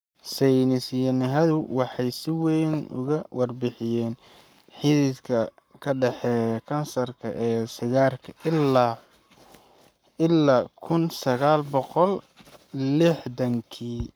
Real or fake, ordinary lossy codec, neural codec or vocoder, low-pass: fake; none; codec, 44.1 kHz, 7.8 kbps, Pupu-Codec; none